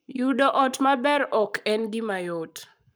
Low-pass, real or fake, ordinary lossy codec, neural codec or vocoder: none; fake; none; codec, 44.1 kHz, 7.8 kbps, Pupu-Codec